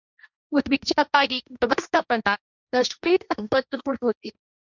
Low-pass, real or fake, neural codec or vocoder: 7.2 kHz; fake; codec, 16 kHz, 1.1 kbps, Voila-Tokenizer